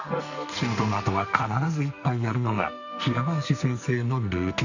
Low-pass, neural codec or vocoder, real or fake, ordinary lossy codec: 7.2 kHz; codec, 44.1 kHz, 2.6 kbps, SNAC; fake; none